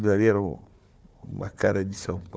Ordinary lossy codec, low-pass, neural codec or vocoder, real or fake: none; none; codec, 16 kHz, 4 kbps, FunCodec, trained on Chinese and English, 50 frames a second; fake